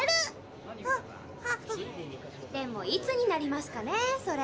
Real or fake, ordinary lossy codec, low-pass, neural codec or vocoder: real; none; none; none